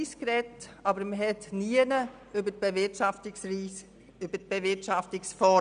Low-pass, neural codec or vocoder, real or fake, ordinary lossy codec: 9.9 kHz; none; real; none